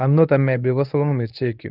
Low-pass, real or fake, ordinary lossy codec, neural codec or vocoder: 5.4 kHz; fake; Opus, 32 kbps; codec, 24 kHz, 0.9 kbps, WavTokenizer, medium speech release version 1